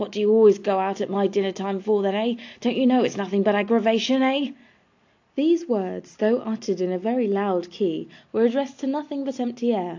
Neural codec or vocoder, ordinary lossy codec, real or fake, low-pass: none; AAC, 48 kbps; real; 7.2 kHz